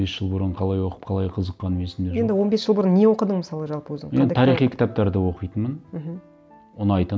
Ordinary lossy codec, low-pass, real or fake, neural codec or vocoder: none; none; real; none